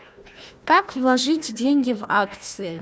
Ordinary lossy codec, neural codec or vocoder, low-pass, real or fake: none; codec, 16 kHz, 1 kbps, FunCodec, trained on Chinese and English, 50 frames a second; none; fake